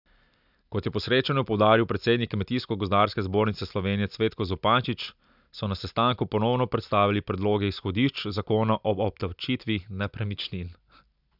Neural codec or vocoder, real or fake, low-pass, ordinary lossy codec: none; real; 5.4 kHz; none